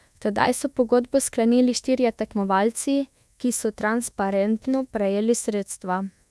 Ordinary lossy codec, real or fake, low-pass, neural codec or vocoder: none; fake; none; codec, 24 kHz, 1.2 kbps, DualCodec